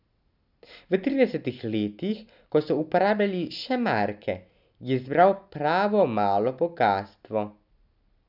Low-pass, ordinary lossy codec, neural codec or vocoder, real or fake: 5.4 kHz; none; none; real